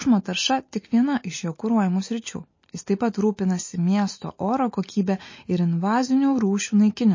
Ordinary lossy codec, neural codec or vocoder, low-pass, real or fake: MP3, 32 kbps; none; 7.2 kHz; real